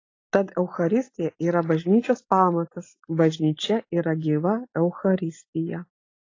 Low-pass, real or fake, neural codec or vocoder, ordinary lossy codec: 7.2 kHz; real; none; AAC, 32 kbps